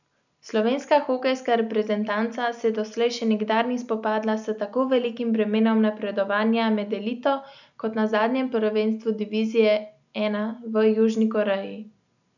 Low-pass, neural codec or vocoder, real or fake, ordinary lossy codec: 7.2 kHz; none; real; none